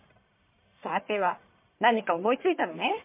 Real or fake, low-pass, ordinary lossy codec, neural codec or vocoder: fake; 3.6 kHz; MP3, 24 kbps; codec, 44.1 kHz, 1.7 kbps, Pupu-Codec